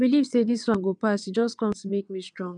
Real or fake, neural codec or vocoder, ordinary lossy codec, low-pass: fake; vocoder, 22.05 kHz, 80 mel bands, WaveNeXt; none; 9.9 kHz